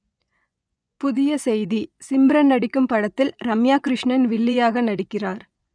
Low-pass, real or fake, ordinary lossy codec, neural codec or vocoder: 9.9 kHz; fake; none; vocoder, 24 kHz, 100 mel bands, Vocos